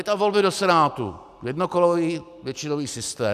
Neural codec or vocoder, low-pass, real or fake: none; 14.4 kHz; real